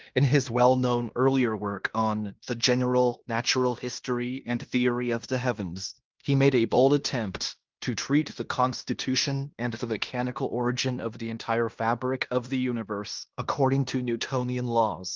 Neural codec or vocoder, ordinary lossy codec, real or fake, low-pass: codec, 16 kHz in and 24 kHz out, 0.9 kbps, LongCat-Audio-Codec, fine tuned four codebook decoder; Opus, 32 kbps; fake; 7.2 kHz